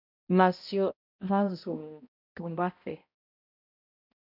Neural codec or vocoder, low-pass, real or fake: codec, 16 kHz, 0.5 kbps, X-Codec, HuBERT features, trained on balanced general audio; 5.4 kHz; fake